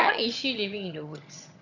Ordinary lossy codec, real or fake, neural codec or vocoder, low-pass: none; fake; vocoder, 22.05 kHz, 80 mel bands, HiFi-GAN; 7.2 kHz